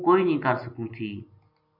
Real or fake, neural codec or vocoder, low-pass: real; none; 5.4 kHz